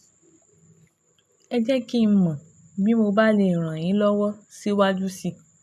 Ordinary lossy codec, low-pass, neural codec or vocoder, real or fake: none; none; none; real